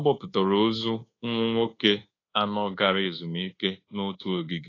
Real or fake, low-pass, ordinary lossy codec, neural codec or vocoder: fake; 7.2 kHz; AAC, 32 kbps; codec, 24 kHz, 1.2 kbps, DualCodec